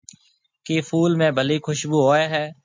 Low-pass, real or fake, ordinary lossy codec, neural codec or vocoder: 7.2 kHz; real; MP3, 64 kbps; none